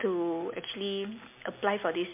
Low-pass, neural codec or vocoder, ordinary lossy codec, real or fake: 3.6 kHz; none; MP3, 24 kbps; real